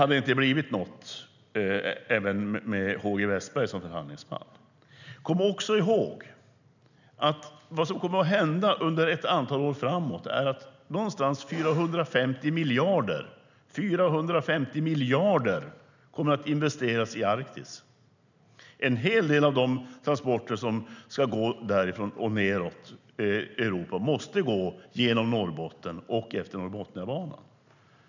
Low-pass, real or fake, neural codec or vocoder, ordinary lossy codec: 7.2 kHz; real; none; none